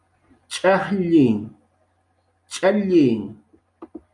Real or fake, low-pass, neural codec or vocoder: real; 10.8 kHz; none